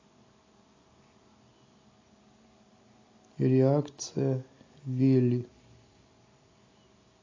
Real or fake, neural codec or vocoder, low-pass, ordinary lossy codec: real; none; 7.2 kHz; AAC, 32 kbps